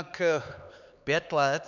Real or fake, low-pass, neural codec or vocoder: fake; 7.2 kHz; codec, 16 kHz, 4 kbps, X-Codec, HuBERT features, trained on LibriSpeech